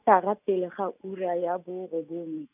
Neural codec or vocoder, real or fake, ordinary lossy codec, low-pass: none; real; none; 3.6 kHz